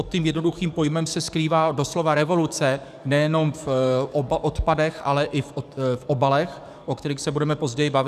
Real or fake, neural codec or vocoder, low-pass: fake; codec, 44.1 kHz, 7.8 kbps, DAC; 14.4 kHz